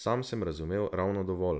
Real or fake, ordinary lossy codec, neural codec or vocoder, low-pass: real; none; none; none